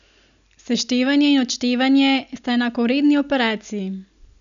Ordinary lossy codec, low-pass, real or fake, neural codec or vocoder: none; 7.2 kHz; real; none